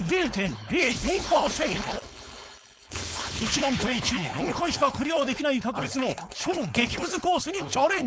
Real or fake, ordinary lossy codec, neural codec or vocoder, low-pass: fake; none; codec, 16 kHz, 4.8 kbps, FACodec; none